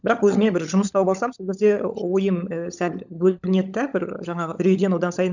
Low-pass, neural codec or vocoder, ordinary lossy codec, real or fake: 7.2 kHz; codec, 16 kHz, 16 kbps, FunCodec, trained on LibriTTS, 50 frames a second; none; fake